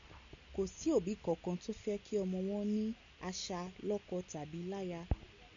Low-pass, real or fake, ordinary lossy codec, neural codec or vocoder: 7.2 kHz; real; MP3, 64 kbps; none